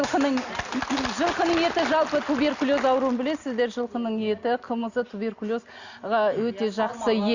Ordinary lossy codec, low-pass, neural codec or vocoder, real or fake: Opus, 64 kbps; 7.2 kHz; none; real